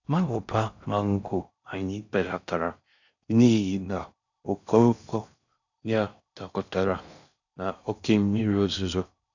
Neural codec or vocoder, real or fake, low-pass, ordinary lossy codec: codec, 16 kHz in and 24 kHz out, 0.6 kbps, FocalCodec, streaming, 4096 codes; fake; 7.2 kHz; none